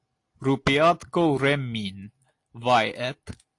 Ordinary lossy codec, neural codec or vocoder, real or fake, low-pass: AAC, 48 kbps; none; real; 10.8 kHz